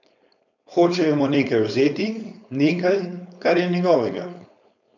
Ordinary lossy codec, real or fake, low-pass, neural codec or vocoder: none; fake; 7.2 kHz; codec, 16 kHz, 4.8 kbps, FACodec